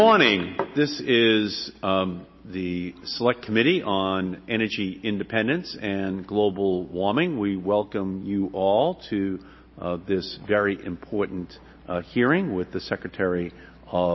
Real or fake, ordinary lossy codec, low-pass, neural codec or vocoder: real; MP3, 24 kbps; 7.2 kHz; none